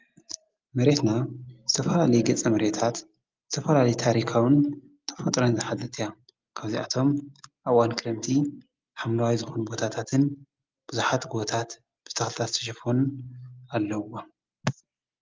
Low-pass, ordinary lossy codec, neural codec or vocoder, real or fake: 7.2 kHz; Opus, 32 kbps; none; real